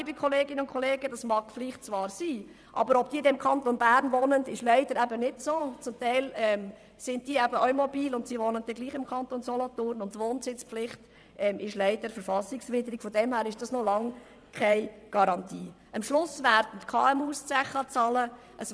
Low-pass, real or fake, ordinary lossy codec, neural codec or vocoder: none; fake; none; vocoder, 22.05 kHz, 80 mel bands, WaveNeXt